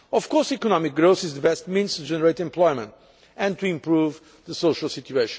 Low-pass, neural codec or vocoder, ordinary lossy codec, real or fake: none; none; none; real